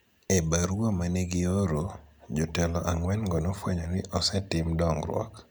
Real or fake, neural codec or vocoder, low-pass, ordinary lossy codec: real; none; none; none